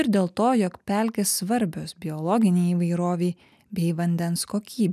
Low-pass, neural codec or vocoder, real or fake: 14.4 kHz; none; real